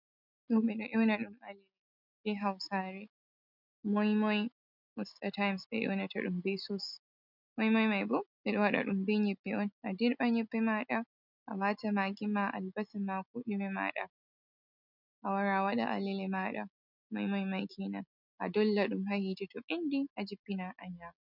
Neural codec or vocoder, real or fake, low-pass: none; real; 5.4 kHz